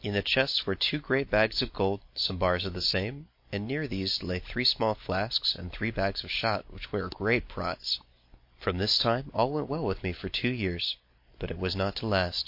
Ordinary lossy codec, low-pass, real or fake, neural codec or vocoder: MP3, 32 kbps; 5.4 kHz; real; none